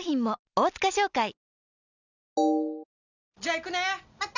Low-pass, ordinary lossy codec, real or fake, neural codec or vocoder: 7.2 kHz; none; real; none